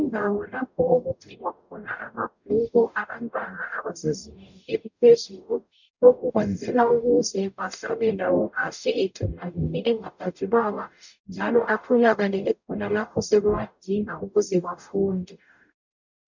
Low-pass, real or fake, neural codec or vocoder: 7.2 kHz; fake; codec, 44.1 kHz, 0.9 kbps, DAC